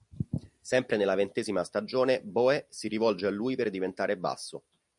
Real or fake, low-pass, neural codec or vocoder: real; 10.8 kHz; none